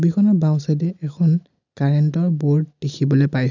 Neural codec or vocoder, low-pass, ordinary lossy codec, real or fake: none; 7.2 kHz; none; real